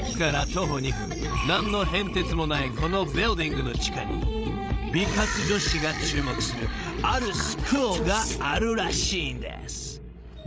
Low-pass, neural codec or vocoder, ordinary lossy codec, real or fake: none; codec, 16 kHz, 16 kbps, FreqCodec, larger model; none; fake